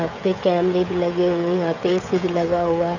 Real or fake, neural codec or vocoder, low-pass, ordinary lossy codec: fake; codec, 16 kHz, 16 kbps, FreqCodec, smaller model; 7.2 kHz; none